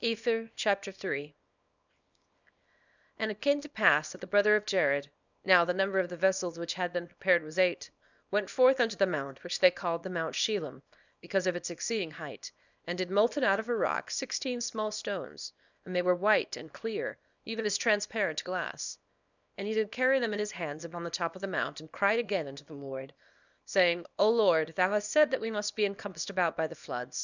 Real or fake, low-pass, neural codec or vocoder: fake; 7.2 kHz; codec, 24 kHz, 0.9 kbps, WavTokenizer, small release